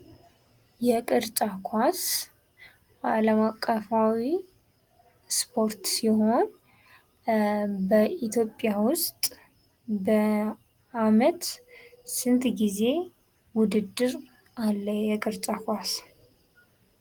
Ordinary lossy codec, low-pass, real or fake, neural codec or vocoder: Opus, 24 kbps; 19.8 kHz; real; none